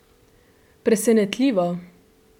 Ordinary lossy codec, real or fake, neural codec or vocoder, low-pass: none; real; none; 19.8 kHz